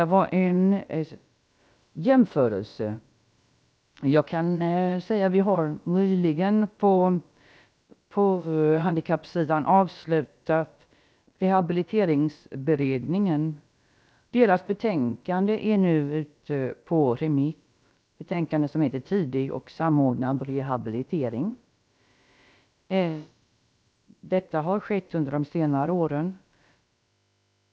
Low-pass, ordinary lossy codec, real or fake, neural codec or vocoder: none; none; fake; codec, 16 kHz, about 1 kbps, DyCAST, with the encoder's durations